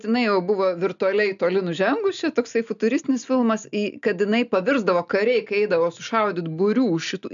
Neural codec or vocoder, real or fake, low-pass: none; real; 7.2 kHz